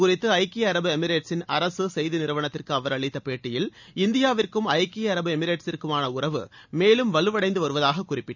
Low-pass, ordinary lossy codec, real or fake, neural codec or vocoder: 7.2 kHz; none; real; none